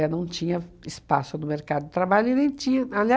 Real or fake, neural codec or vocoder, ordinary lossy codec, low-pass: real; none; none; none